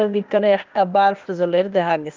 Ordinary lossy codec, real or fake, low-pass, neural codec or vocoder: Opus, 32 kbps; fake; 7.2 kHz; codec, 16 kHz, 0.8 kbps, ZipCodec